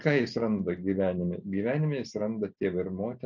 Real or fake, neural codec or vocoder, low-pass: real; none; 7.2 kHz